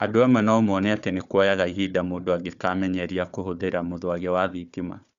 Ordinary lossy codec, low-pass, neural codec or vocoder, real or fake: none; 7.2 kHz; codec, 16 kHz, 4 kbps, FunCodec, trained on Chinese and English, 50 frames a second; fake